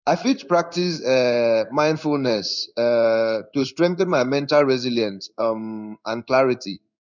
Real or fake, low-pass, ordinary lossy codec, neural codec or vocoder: fake; 7.2 kHz; none; codec, 16 kHz in and 24 kHz out, 1 kbps, XY-Tokenizer